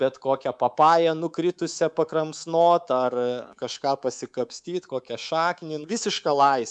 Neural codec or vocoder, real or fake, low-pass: codec, 24 kHz, 3.1 kbps, DualCodec; fake; 10.8 kHz